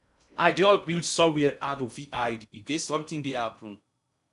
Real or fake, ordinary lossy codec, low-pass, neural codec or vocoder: fake; none; 10.8 kHz; codec, 16 kHz in and 24 kHz out, 0.6 kbps, FocalCodec, streaming, 2048 codes